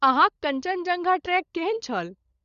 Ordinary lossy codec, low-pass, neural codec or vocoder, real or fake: Opus, 64 kbps; 7.2 kHz; codec, 16 kHz, 16 kbps, FunCodec, trained on LibriTTS, 50 frames a second; fake